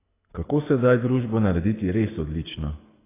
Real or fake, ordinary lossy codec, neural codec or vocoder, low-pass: fake; AAC, 16 kbps; codec, 24 kHz, 6 kbps, HILCodec; 3.6 kHz